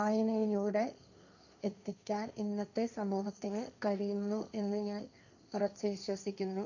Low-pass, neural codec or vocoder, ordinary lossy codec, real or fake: 7.2 kHz; codec, 16 kHz, 1.1 kbps, Voila-Tokenizer; none; fake